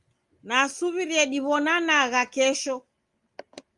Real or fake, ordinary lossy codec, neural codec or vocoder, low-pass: real; Opus, 32 kbps; none; 10.8 kHz